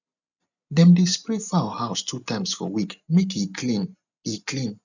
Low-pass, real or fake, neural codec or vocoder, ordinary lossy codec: 7.2 kHz; real; none; none